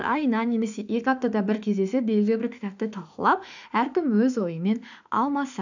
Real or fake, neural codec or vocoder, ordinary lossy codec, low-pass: fake; autoencoder, 48 kHz, 32 numbers a frame, DAC-VAE, trained on Japanese speech; none; 7.2 kHz